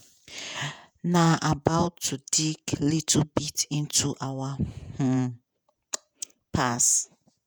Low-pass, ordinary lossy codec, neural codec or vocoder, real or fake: none; none; vocoder, 48 kHz, 128 mel bands, Vocos; fake